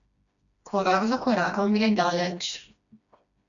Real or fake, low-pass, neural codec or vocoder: fake; 7.2 kHz; codec, 16 kHz, 1 kbps, FreqCodec, smaller model